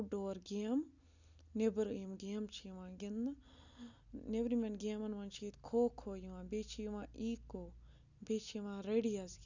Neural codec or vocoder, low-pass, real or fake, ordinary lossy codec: none; 7.2 kHz; real; none